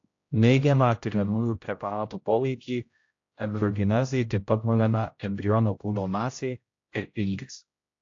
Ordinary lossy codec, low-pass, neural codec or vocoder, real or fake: AAC, 48 kbps; 7.2 kHz; codec, 16 kHz, 0.5 kbps, X-Codec, HuBERT features, trained on general audio; fake